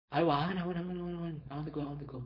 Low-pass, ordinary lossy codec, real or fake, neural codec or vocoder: 5.4 kHz; MP3, 32 kbps; fake; codec, 16 kHz, 4.8 kbps, FACodec